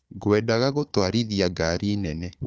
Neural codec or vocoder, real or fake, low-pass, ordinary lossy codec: codec, 16 kHz, 4 kbps, FunCodec, trained on Chinese and English, 50 frames a second; fake; none; none